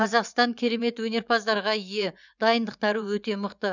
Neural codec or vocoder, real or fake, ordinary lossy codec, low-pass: vocoder, 24 kHz, 100 mel bands, Vocos; fake; none; 7.2 kHz